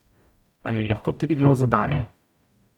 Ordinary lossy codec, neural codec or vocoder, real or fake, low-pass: none; codec, 44.1 kHz, 0.9 kbps, DAC; fake; 19.8 kHz